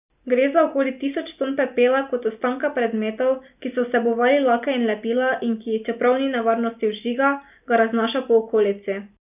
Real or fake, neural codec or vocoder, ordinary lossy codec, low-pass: real; none; none; 3.6 kHz